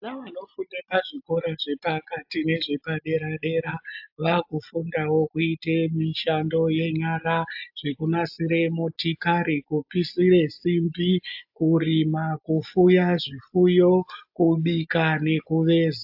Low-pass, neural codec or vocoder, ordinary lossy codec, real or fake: 5.4 kHz; none; AAC, 48 kbps; real